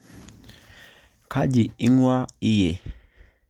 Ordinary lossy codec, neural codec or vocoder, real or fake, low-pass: Opus, 32 kbps; none; real; 19.8 kHz